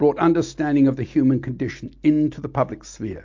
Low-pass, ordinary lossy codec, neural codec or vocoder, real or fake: 7.2 kHz; MP3, 48 kbps; none; real